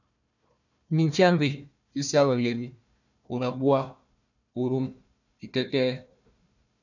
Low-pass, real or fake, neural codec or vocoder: 7.2 kHz; fake; codec, 16 kHz, 1 kbps, FunCodec, trained on Chinese and English, 50 frames a second